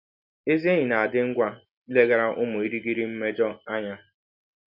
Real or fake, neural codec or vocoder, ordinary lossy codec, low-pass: real; none; Opus, 64 kbps; 5.4 kHz